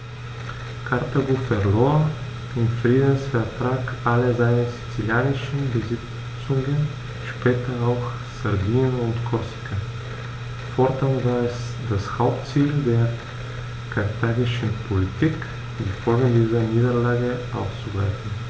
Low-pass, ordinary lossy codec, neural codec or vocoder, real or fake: none; none; none; real